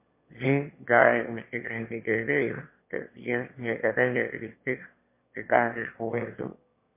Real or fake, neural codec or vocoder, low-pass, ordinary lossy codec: fake; autoencoder, 22.05 kHz, a latent of 192 numbers a frame, VITS, trained on one speaker; 3.6 kHz; MP3, 24 kbps